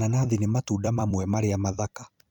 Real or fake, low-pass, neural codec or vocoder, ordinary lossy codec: fake; 19.8 kHz; vocoder, 44.1 kHz, 128 mel bands every 256 samples, BigVGAN v2; none